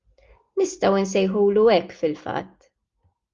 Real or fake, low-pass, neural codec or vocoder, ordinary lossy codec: real; 7.2 kHz; none; Opus, 32 kbps